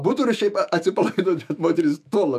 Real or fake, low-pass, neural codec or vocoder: fake; 14.4 kHz; autoencoder, 48 kHz, 128 numbers a frame, DAC-VAE, trained on Japanese speech